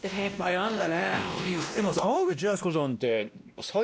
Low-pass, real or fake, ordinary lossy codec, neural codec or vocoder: none; fake; none; codec, 16 kHz, 1 kbps, X-Codec, WavLM features, trained on Multilingual LibriSpeech